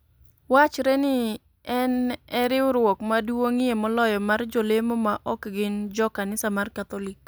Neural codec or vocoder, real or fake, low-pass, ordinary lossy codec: none; real; none; none